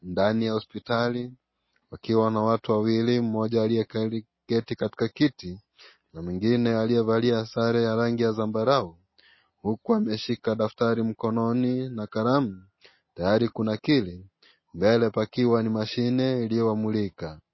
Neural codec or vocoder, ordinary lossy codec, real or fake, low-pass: none; MP3, 24 kbps; real; 7.2 kHz